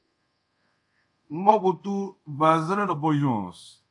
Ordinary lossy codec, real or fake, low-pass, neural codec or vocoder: AAC, 64 kbps; fake; 10.8 kHz; codec, 24 kHz, 0.5 kbps, DualCodec